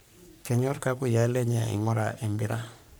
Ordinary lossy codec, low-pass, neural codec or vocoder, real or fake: none; none; codec, 44.1 kHz, 3.4 kbps, Pupu-Codec; fake